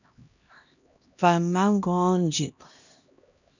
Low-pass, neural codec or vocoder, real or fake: 7.2 kHz; codec, 16 kHz, 1 kbps, X-Codec, HuBERT features, trained on LibriSpeech; fake